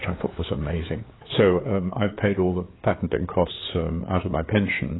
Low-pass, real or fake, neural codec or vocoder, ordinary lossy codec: 7.2 kHz; fake; codec, 16 kHz, 4 kbps, FreqCodec, larger model; AAC, 16 kbps